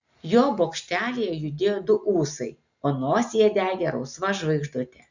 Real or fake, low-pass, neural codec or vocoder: real; 7.2 kHz; none